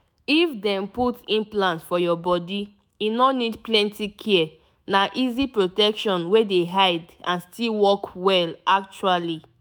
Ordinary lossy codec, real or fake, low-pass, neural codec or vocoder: none; fake; none; autoencoder, 48 kHz, 128 numbers a frame, DAC-VAE, trained on Japanese speech